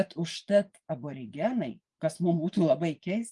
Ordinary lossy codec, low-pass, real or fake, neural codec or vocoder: Opus, 32 kbps; 10.8 kHz; fake; vocoder, 24 kHz, 100 mel bands, Vocos